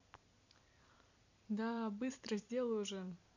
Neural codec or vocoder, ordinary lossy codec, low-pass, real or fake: vocoder, 44.1 kHz, 128 mel bands every 256 samples, BigVGAN v2; none; 7.2 kHz; fake